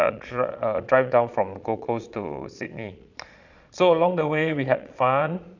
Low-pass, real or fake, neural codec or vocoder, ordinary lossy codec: 7.2 kHz; fake; vocoder, 22.05 kHz, 80 mel bands, Vocos; none